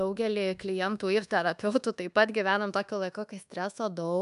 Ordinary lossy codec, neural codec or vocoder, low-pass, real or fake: MP3, 96 kbps; codec, 24 kHz, 1.2 kbps, DualCodec; 10.8 kHz; fake